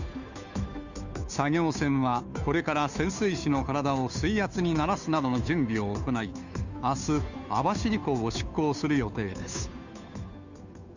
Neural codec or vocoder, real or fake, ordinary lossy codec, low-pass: codec, 16 kHz, 2 kbps, FunCodec, trained on Chinese and English, 25 frames a second; fake; none; 7.2 kHz